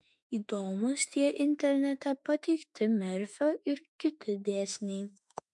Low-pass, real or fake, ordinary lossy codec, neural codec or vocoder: 10.8 kHz; fake; MP3, 64 kbps; autoencoder, 48 kHz, 32 numbers a frame, DAC-VAE, trained on Japanese speech